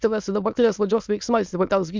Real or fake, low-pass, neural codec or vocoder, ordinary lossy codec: fake; 7.2 kHz; autoencoder, 22.05 kHz, a latent of 192 numbers a frame, VITS, trained on many speakers; MP3, 64 kbps